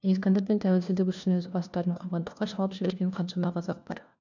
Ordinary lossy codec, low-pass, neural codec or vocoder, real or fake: none; 7.2 kHz; codec, 16 kHz, 1 kbps, FunCodec, trained on LibriTTS, 50 frames a second; fake